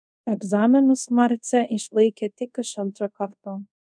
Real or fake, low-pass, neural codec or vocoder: fake; 9.9 kHz; codec, 24 kHz, 0.5 kbps, DualCodec